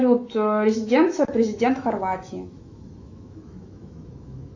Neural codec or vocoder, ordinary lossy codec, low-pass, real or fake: autoencoder, 48 kHz, 128 numbers a frame, DAC-VAE, trained on Japanese speech; AAC, 48 kbps; 7.2 kHz; fake